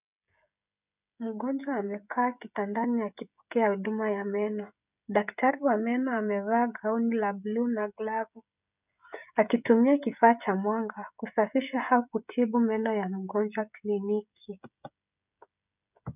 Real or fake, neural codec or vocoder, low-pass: fake; codec, 16 kHz, 16 kbps, FreqCodec, smaller model; 3.6 kHz